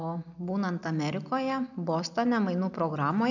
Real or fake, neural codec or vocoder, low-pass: real; none; 7.2 kHz